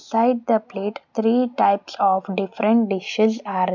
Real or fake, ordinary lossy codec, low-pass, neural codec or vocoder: real; none; 7.2 kHz; none